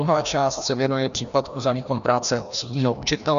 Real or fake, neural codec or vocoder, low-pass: fake; codec, 16 kHz, 1 kbps, FreqCodec, larger model; 7.2 kHz